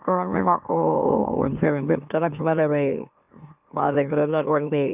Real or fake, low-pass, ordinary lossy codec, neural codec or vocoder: fake; 3.6 kHz; none; autoencoder, 44.1 kHz, a latent of 192 numbers a frame, MeloTTS